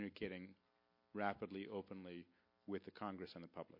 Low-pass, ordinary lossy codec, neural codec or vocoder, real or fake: 5.4 kHz; MP3, 32 kbps; none; real